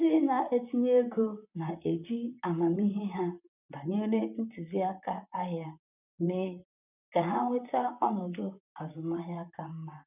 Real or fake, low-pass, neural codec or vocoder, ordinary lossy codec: fake; 3.6 kHz; vocoder, 44.1 kHz, 128 mel bands, Pupu-Vocoder; none